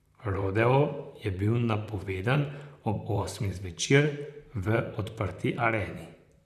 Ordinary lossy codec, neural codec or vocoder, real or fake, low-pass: none; vocoder, 44.1 kHz, 128 mel bands, Pupu-Vocoder; fake; 14.4 kHz